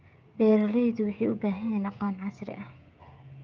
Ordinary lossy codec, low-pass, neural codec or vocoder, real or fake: Opus, 24 kbps; 7.2 kHz; codec, 16 kHz, 16 kbps, FreqCodec, smaller model; fake